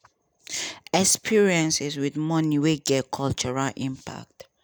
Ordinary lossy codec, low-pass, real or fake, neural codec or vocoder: none; none; real; none